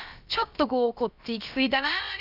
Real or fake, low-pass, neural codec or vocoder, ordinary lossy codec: fake; 5.4 kHz; codec, 16 kHz, about 1 kbps, DyCAST, with the encoder's durations; none